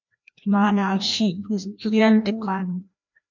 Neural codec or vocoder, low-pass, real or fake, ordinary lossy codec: codec, 16 kHz, 1 kbps, FreqCodec, larger model; 7.2 kHz; fake; MP3, 64 kbps